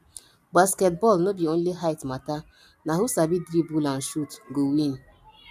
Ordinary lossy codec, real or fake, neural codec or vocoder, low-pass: none; real; none; 14.4 kHz